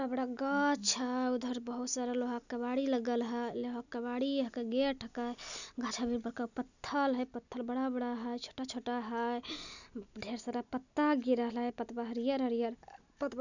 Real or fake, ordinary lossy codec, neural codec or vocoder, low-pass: real; none; none; 7.2 kHz